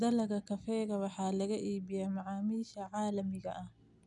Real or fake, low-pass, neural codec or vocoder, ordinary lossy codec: real; 10.8 kHz; none; none